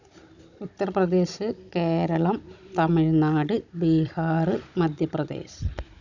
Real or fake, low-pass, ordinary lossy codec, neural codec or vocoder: fake; 7.2 kHz; none; codec, 16 kHz, 16 kbps, FunCodec, trained on Chinese and English, 50 frames a second